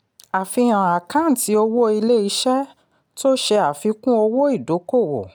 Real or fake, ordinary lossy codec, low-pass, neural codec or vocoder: real; none; none; none